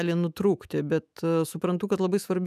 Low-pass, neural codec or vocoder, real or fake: 14.4 kHz; autoencoder, 48 kHz, 128 numbers a frame, DAC-VAE, trained on Japanese speech; fake